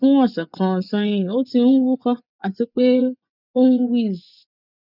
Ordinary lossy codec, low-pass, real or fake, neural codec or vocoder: none; 5.4 kHz; fake; vocoder, 24 kHz, 100 mel bands, Vocos